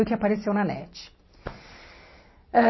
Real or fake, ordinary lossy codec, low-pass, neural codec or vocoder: real; MP3, 24 kbps; 7.2 kHz; none